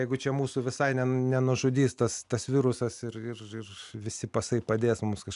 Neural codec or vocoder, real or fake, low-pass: none; real; 10.8 kHz